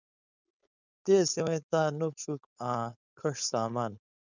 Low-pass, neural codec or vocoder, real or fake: 7.2 kHz; codec, 16 kHz, 4.8 kbps, FACodec; fake